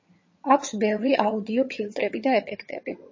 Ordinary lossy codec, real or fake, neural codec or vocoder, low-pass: MP3, 32 kbps; fake; vocoder, 22.05 kHz, 80 mel bands, HiFi-GAN; 7.2 kHz